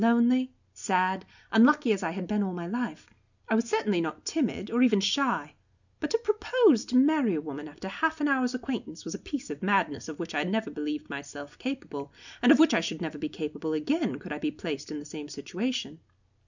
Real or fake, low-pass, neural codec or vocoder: real; 7.2 kHz; none